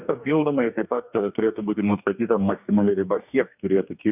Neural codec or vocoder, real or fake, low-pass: codec, 44.1 kHz, 2.6 kbps, DAC; fake; 3.6 kHz